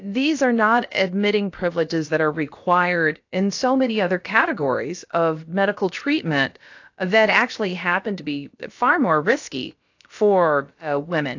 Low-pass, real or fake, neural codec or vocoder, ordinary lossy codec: 7.2 kHz; fake; codec, 16 kHz, about 1 kbps, DyCAST, with the encoder's durations; AAC, 48 kbps